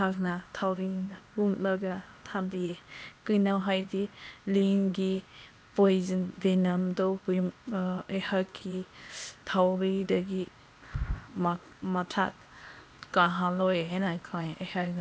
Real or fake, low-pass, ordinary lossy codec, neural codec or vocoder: fake; none; none; codec, 16 kHz, 0.8 kbps, ZipCodec